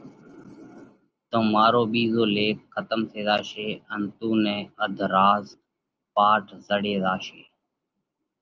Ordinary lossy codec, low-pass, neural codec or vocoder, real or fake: Opus, 24 kbps; 7.2 kHz; none; real